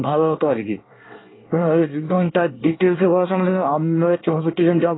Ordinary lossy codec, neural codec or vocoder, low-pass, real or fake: AAC, 16 kbps; codec, 24 kHz, 1 kbps, SNAC; 7.2 kHz; fake